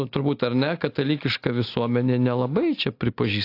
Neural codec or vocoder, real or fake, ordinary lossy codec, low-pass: none; real; AAC, 32 kbps; 5.4 kHz